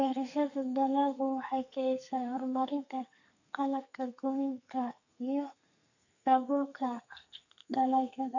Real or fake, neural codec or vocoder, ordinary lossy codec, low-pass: fake; codec, 32 kHz, 1.9 kbps, SNAC; none; 7.2 kHz